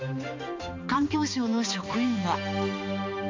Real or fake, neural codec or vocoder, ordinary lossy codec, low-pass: fake; codec, 16 kHz, 2 kbps, X-Codec, HuBERT features, trained on balanced general audio; MP3, 48 kbps; 7.2 kHz